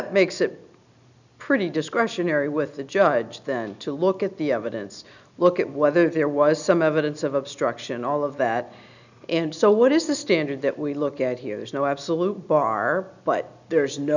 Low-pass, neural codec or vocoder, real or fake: 7.2 kHz; none; real